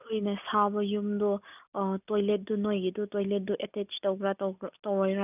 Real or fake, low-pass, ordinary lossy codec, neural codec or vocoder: real; 3.6 kHz; none; none